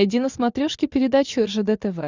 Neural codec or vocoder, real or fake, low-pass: none; real; 7.2 kHz